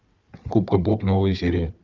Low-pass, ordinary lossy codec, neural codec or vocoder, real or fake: 7.2 kHz; Opus, 32 kbps; codec, 16 kHz, 4 kbps, FunCodec, trained on Chinese and English, 50 frames a second; fake